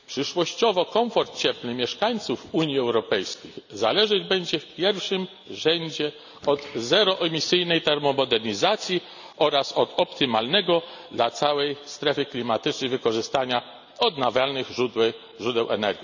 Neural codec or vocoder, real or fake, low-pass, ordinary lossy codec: none; real; 7.2 kHz; none